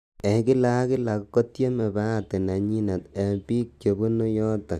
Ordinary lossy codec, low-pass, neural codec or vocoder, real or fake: MP3, 96 kbps; 14.4 kHz; none; real